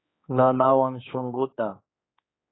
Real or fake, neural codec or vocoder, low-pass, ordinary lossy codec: fake; codec, 16 kHz, 4 kbps, X-Codec, HuBERT features, trained on general audio; 7.2 kHz; AAC, 16 kbps